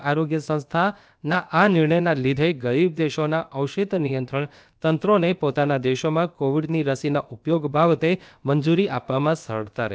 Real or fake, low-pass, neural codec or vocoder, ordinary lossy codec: fake; none; codec, 16 kHz, about 1 kbps, DyCAST, with the encoder's durations; none